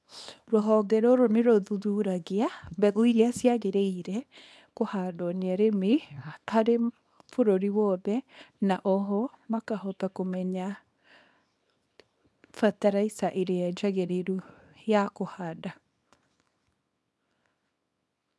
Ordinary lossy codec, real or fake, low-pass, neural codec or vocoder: none; fake; none; codec, 24 kHz, 0.9 kbps, WavTokenizer, small release